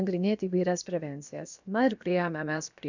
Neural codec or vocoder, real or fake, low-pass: codec, 16 kHz, about 1 kbps, DyCAST, with the encoder's durations; fake; 7.2 kHz